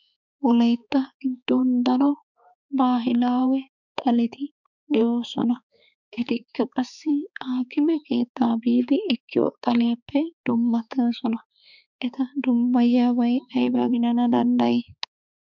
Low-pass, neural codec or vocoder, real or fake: 7.2 kHz; codec, 16 kHz, 4 kbps, X-Codec, HuBERT features, trained on balanced general audio; fake